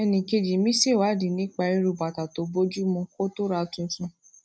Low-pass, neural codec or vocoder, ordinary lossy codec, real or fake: none; none; none; real